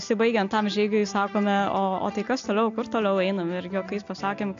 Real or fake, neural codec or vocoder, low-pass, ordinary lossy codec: real; none; 7.2 kHz; AAC, 64 kbps